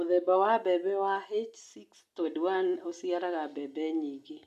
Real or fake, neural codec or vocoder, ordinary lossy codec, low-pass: real; none; none; 14.4 kHz